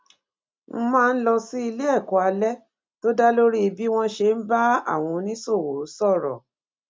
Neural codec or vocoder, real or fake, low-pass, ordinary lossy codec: none; real; none; none